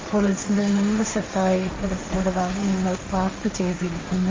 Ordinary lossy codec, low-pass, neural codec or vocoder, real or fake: Opus, 32 kbps; 7.2 kHz; codec, 16 kHz, 1.1 kbps, Voila-Tokenizer; fake